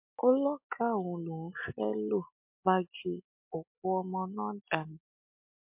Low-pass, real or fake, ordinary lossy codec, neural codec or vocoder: 3.6 kHz; real; MP3, 32 kbps; none